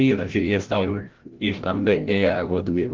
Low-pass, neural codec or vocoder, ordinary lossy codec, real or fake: 7.2 kHz; codec, 16 kHz, 0.5 kbps, FreqCodec, larger model; Opus, 16 kbps; fake